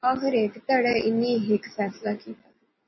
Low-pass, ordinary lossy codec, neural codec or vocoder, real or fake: 7.2 kHz; MP3, 24 kbps; none; real